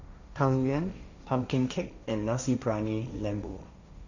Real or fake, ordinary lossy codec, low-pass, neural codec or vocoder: fake; none; 7.2 kHz; codec, 16 kHz, 1.1 kbps, Voila-Tokenizer